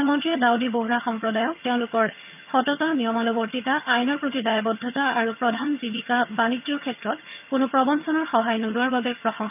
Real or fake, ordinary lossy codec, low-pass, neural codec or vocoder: fake; none; 3.6 kHz; vocoder, 22.05 kHz, 80 mel bands, HiFi-GAN